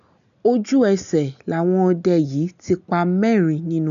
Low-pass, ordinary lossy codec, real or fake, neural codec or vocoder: 7.2 kHz; none; real; none